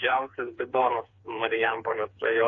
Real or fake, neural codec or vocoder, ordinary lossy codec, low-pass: fake; codec, 16 kHz, 4 kbps, FreqCodec, smaller model; AAC, 48 kbps; 7.2 kHz